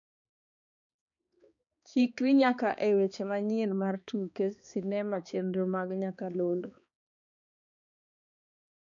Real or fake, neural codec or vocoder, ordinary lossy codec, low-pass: fake; codec, 16 kHz, 2 kbps, X-Codec, HuBERT features, trained on balanced general audio; none; 7.2 kHz